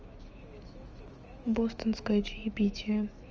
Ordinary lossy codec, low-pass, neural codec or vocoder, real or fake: Opus, 24 kbps; 7.2 kHz; autoencoder, 48 kHz, 128 numbers a frame, DAC-VAE, trained on Japanese speech; fake